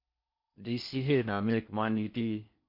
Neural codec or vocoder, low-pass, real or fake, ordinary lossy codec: codec, 16 kHz in and 24 kHz out, 0.6 kbps, FocalCodec, streaming, 4096 codes; 5.4 kHz; fake; MP3, 32 kbps